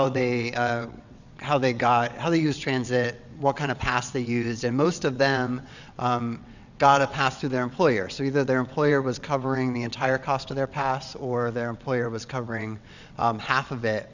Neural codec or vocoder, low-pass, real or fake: vocoder, 22.05 kHz, 80 mel bands, WaveNeXt; 7.2 kHz; fake